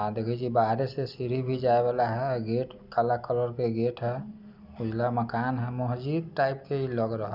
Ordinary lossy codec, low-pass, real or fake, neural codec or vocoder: none; 5.4 kHz; real; none